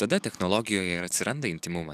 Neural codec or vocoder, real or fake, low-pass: codec, 44.1 kHz, 7.8 kbps, DAC; fake; 14.4 kHz